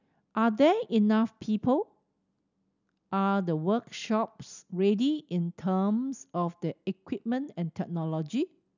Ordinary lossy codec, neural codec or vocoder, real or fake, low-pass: none; none; real; 7.2 kHz